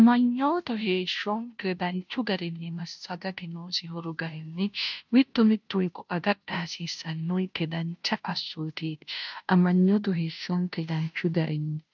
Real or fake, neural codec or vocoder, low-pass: fake; codec, 16 kHz, 0.5 kbps, FunCodec, trained on Chinese and English, 25 frames a second; 7.2 kHz